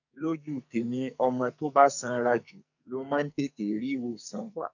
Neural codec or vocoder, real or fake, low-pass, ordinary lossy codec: codec, 44.1 kHz, 2.6 kbps, SNAC; fake; 7.2 kHz; none